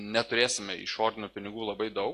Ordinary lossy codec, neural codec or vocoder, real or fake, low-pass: AAC, 48 kbps; none; real; 14.4 kHz